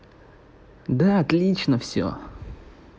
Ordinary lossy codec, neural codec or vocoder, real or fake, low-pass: none; none; real; none